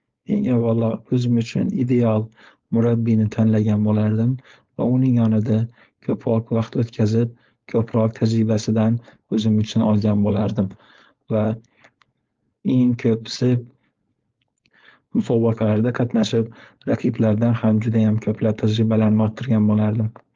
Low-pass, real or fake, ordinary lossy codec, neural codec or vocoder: 7.2 kHz; fake; Opus, 32 kbps; codec, 16 kHz, 4.8 kbps, FACodec